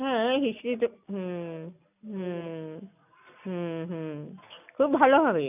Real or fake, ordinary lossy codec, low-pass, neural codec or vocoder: real; none; 3.6 kHz; none